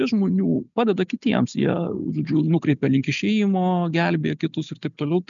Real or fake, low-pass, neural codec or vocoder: real; 7.2 kHz; none